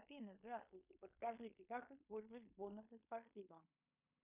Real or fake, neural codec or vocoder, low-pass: fake; codec, 16 kHz in and 24 kHz out, 0.9 kbps, LongCat-Audio-Codec, fine tuned four codebook decoder; 3.6 kHz